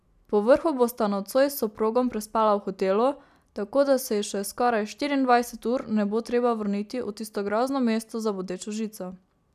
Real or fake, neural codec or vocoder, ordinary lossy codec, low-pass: real; none; none; 14.4 kHz